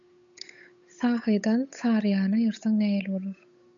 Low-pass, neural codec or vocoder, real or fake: 7.2 kHz; codec, 16 kHz, 8 kbps, FunCodec, trained on Chinese and English, 25 frames a second; fake